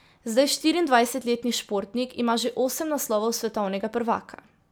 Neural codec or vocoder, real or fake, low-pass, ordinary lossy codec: none; real; none; none